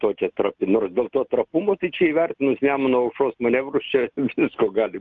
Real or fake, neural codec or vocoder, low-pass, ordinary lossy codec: real; none; 7.2 kHz; Opus, 32 kbps